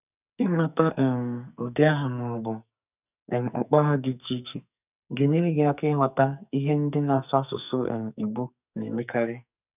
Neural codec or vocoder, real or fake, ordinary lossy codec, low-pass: codec, 44.1 kHz, 2.6 kbps, SNAC; fake; none; 3.6 kHz